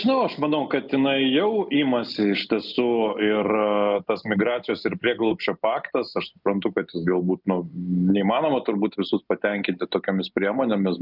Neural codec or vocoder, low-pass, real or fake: none; 5.4 kHz; real